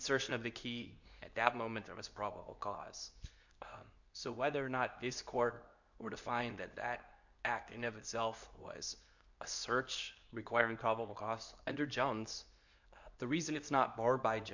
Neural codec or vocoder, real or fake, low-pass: codec, 24 kHz, 0.9 kbps, WavTokenizer, medium speech release version 2; fake; 7.2 kHz